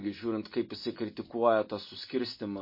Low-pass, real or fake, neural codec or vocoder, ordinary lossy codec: 5.4 kHz; real; none; MP3, 24 kbps